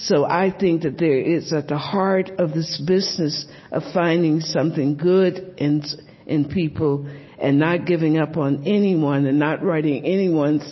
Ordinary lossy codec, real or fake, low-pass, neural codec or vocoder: MP3, 24 kbps; real; 7.2 kHz; none